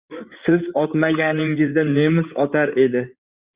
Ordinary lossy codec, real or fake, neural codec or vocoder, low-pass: Opus, 64 kbps; fake; codec, 16 kHz in and 24 kHz out, 2.2 kbps, FireRedTTS-2 codec; 3.6 kHz